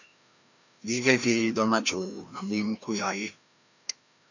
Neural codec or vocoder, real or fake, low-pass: codec, 16 kHz, 1 kbps, FreqCodec, larger model; fake; 7.2 kHz